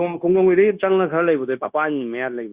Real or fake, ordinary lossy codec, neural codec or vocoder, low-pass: fake; Opus, 64 kbps; codec, 16 kHz, 0.9 kbps, LongCat-Audio-Codec; 3.6 kHz